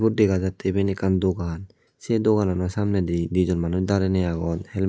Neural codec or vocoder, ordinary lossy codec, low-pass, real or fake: none; none; none; real